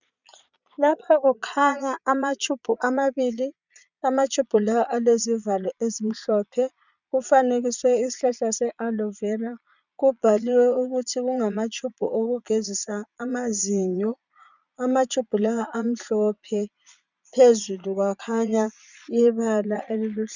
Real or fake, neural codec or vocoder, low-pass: fake; vocoder, 22.05 kHz, 80 mel bands, Vocos; 7.2 kHz